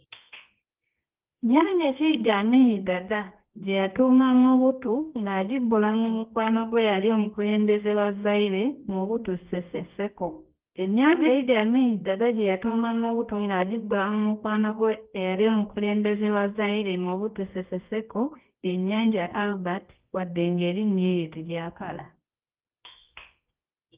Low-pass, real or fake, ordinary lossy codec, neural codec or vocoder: 3.6 kHz; fake; Opus, 32 kbps; codec, 24 kHz, 0.9 kbps, WavTokenizer, medium music audio release